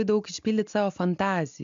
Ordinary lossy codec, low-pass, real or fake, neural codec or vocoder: MP3, 64 kbps; 7.2 kHz; real; none